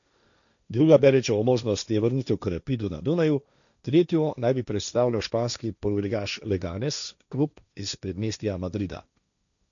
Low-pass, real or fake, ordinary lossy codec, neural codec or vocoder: 7.2 kHz; fake; none; codec, 16 kHz, 1.1 kbps, Voila-Tokenizer